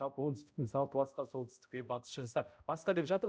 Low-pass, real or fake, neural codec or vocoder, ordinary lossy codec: none; fake; codec, 16 kHz, 0.5 kbps, X-Codec, HuBERT features, trained on balanced general audio; none